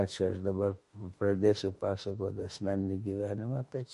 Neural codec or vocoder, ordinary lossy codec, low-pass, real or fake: autoencoder, 48 kHz, 32 numbers a frame, DAC-VAE, trained on Japanese speech; MP3, 48 kbps; 14.4 kHz; fake